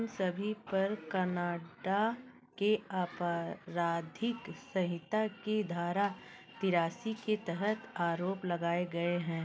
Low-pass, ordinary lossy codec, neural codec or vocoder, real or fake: none; none; none; real